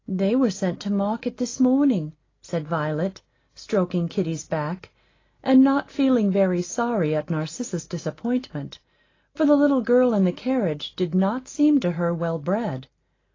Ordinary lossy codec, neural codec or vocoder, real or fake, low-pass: AAC, 32 kbps; none; real; 7.2 kHz